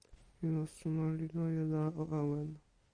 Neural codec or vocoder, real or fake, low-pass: none; real; 9.9 kHz